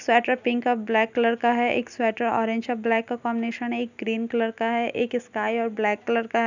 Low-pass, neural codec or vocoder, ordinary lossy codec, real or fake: 7.2 kHz; none; none; real